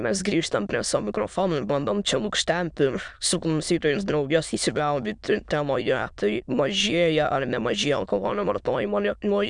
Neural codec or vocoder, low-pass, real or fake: autoencoder, 22.05 kHz, a latent of 192 numbers a frame, VITS, trained on many speakers; 9.9 kHz; fake